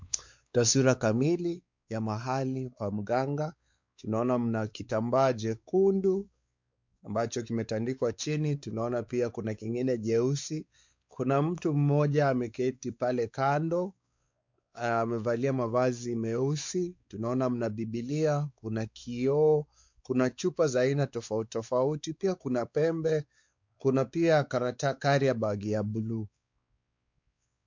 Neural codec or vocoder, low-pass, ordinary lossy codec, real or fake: codec, 16 kHz, 4 kbps, X-Codec, WavLM features, trained on Multilingual LibriSpeech; 7.2 kHz; MP3, 64 kbps; fake